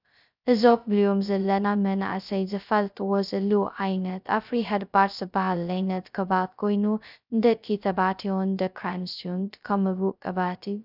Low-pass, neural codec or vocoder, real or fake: 5.4 kHz; codec, 16 kHz, 0.2 kbps, FocalCodec; fake